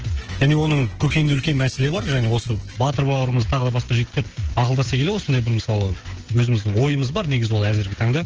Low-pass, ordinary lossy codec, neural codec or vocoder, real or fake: 7.2 kHz; Opus, 16 kbps; codec, 16 kHz, 16 kbps, FreqCodec, smaller model; fake